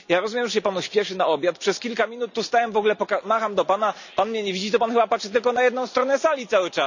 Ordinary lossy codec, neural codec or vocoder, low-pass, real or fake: none; none; 7.2 kHz; real